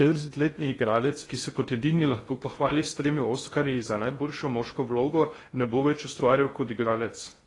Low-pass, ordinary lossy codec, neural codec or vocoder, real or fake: 10.8 kHz; AAC, 32 kbps; codec, 16 kHz in and 24 kHz out, 0.6 kbps, FocalCodec, streaming, 2048 codes; fake